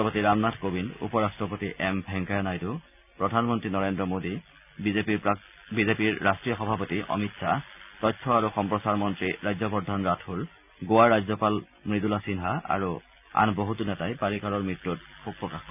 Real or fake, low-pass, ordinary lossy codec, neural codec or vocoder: real; 3.6 kHz; none; none